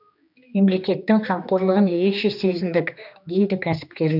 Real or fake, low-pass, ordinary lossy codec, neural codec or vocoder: fake; 5.4 kHz; none; codec, 16 kHz, 2 kbps, X-Codec, HuBERT features, trained on general audio